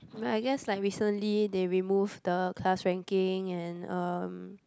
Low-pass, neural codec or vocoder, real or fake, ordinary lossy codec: none; none; real; none